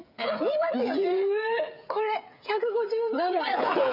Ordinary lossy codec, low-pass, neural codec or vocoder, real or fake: none; 5.4 kHz; codec, 16 kHz, 8 kbps, FreqCodec, smaller model; fake